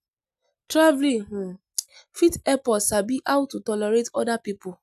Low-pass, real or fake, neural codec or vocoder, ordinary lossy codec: 14.4 kHz; real; none; none